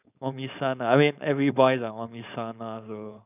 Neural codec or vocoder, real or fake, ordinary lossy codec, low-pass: codec, 16 kHz, 4.8 kbps, FACodec; fake; none; 3.6 kHz